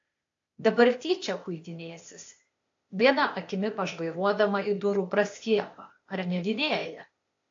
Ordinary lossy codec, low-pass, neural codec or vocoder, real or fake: AAC, 48 kbps; 7.2 kHz; codec, 16 kHz, 0.8 kbps, ZipCodec; fake